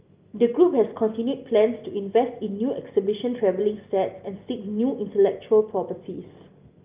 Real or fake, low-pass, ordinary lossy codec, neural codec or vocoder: real; 3.6 kHz; Opus, 24 kbps; none